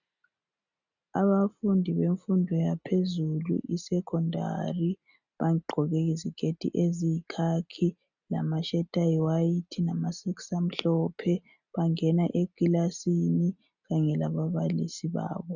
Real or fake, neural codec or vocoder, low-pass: real; none; 7.2 kHz